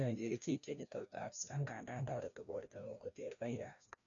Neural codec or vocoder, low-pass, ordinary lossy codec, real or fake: codec, 16 kHz, 1 kbps, FreqCodec, larger model; 7.2 kHz; none; fake